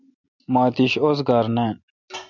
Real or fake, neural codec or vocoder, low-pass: real; none; 7.2 kHz